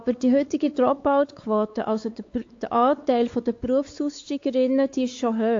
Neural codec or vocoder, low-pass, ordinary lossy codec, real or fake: codec, 16 kHz, 4 kbps, X-Codec, WavLM features, trained on Multilingual LibriSpeech; 7.2 kHz; AAC, 48 kbps; fake